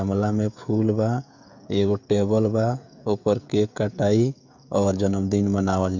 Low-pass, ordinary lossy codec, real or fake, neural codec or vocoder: 7.2 kHz; none; fake; codec, 16 kHz, 16 kbps, FreqCodec, smaller model